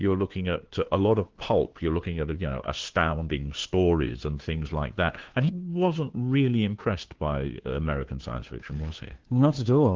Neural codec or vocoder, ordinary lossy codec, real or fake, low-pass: codec, 16 kHz, 2 kbps, FunCodec, trained on Chinese and English, 25 frames a second; Opus, 16 kbps; fake; 7.2 kHz